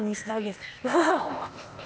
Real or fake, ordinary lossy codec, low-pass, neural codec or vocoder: fake; none; none; codec, 16 kHz, 0.8 kbps, ZipCodec